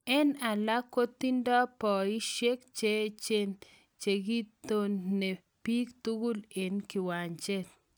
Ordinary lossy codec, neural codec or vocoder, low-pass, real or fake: none; none; none; real